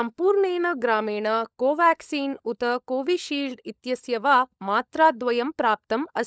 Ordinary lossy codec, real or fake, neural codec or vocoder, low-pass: none; fake; codec, 16 kHz, 16 kbps, FunCodec, trained on LibriTTS, 50 frames a second; none